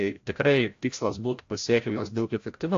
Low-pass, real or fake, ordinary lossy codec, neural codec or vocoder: 7.2 kHz; fake; AAC, 48 kbps; codec, 16 kHz, 0.5 kbps, FreqCodec, larger model